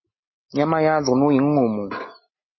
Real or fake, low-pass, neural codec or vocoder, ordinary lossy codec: real; 7.2 kHz; none; MP3, 24 kbps